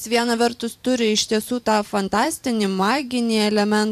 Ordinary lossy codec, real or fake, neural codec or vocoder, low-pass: AAC, 96 kbps; fake; vocoder, 44.1 kHz, 128 mel bands every 512 samples, BigVGAN v2; 14.4 kHz